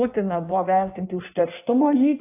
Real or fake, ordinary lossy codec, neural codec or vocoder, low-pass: fake; AAC, 24 kbps; codec, 16 kHz in and 24 kHz out, 1.1 kbps, FireRedTTS-2 codec; 3.6 kHz